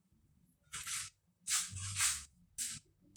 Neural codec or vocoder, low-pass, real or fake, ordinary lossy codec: vocoder, 44.1 kHz, 128 mel bands, Pupu-Vocoder; none; fake; none